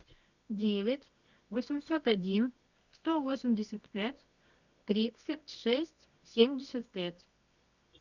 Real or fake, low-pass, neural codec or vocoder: fake; 7.2 kHz; codec, 24 kHz, 0.9 kbps, WavTokenizer, medium music audio release